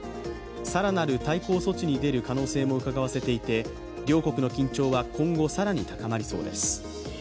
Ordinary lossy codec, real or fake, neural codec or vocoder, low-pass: none; real; none; none